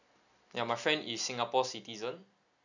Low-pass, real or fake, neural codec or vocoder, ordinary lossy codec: 7.2 kHz; real; none; none